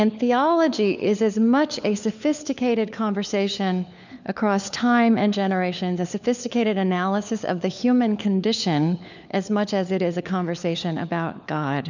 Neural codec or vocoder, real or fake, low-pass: codec, 16 kHz, 4 kbps, FunCodec, trained on LibriTTS, 50 frames a second; fake; 7.2 kHz